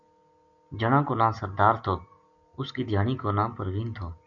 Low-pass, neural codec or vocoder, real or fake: 7.2 kHz; none; real